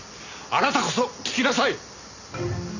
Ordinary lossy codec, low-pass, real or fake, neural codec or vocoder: none; 7.2 kHz; real; none